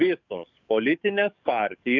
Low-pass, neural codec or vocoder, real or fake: 7.2 kHz; codec, 44.1 kHz, 7.8 kbps, DAC; fake